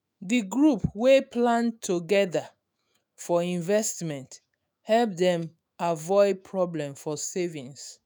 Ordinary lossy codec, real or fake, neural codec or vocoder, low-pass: none; fake; autoencoder, 48 kHz, 128 numbers a frame, DAC-VAE, trained on Japanese speech; none